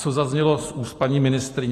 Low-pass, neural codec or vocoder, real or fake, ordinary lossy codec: 14.4 kHz; none; real; AAC, 48 kbps